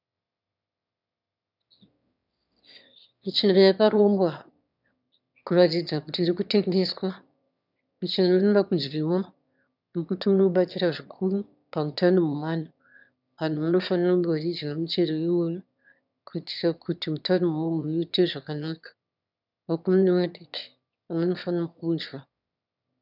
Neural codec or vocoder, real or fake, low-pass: autoencoder, 22.05 kHz, a latent of 192 numbers a frame, VITS, trained on one speaker; fake; 5.4 kHz